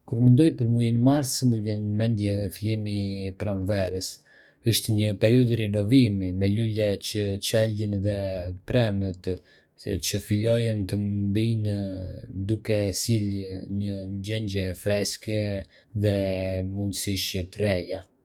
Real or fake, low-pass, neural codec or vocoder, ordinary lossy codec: fake; 19.8 kHz; codec, 44.1 kHz, 2.6 kbps, DAC; none